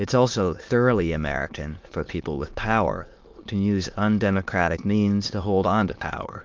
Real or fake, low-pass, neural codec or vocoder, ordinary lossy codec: fake; 7.2 kHz; autoencoder, 22.05 kHz, a latent of 192 numbers a frame, VITS, trained on many speakers; Opus, 32 kbps